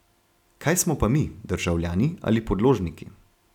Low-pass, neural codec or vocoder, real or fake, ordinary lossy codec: 19.8 kHz; none; real; none